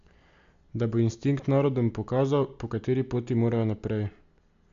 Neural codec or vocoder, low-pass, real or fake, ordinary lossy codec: none; 7.2 kHz; real; MP3, 64 kbps